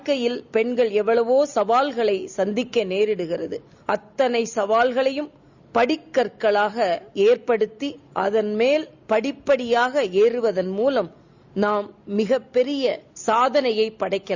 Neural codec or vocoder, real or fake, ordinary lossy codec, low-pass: none; real; Opus, 64 kbps; 7.2 kHz